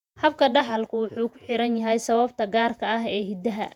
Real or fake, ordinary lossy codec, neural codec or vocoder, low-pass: fake; none; vocoder, 48 kHz, 128 mel bands, Vocos; 19.8 kHz